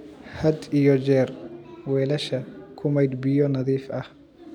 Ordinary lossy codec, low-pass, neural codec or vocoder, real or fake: none; 19.8 kHz; none; real